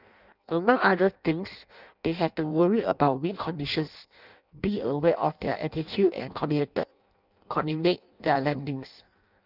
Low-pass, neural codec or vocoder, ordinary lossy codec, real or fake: 5.4 kHz; codec, 16 kHz in and 24 kHz out, 0.6 kbps, FireRedTTS-2 codec; none; fake